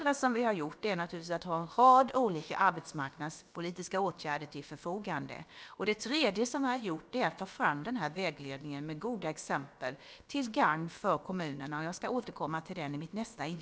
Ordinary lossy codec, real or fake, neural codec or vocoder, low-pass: none; fake; codec, 16 kHz, about 1 kbps, DyCAST, with the encoder's durations; none